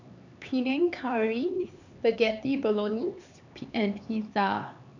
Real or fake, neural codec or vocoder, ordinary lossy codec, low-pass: fake; codec, 16 kHz, 4 kbps, X-Codec, HuBERT features, trained on LibriSpeech; none; 7.2 kHz